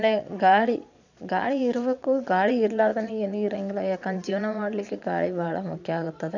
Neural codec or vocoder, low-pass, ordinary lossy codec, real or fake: vocoder, 22.05 kHz, 80 mel bands, Vocos; 7.2 kHz; none; fake